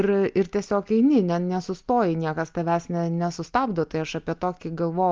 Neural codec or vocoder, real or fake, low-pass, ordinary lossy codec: none; real; 7.2 kHz; Opus, 32 kbps